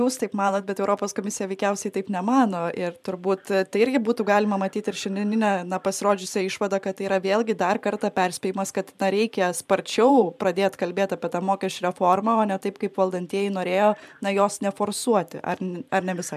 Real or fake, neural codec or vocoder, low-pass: fake; vocoder, 44.1 kHz, 128 mel bands every 512 samples, BigVGAN v2; 14.4 kHz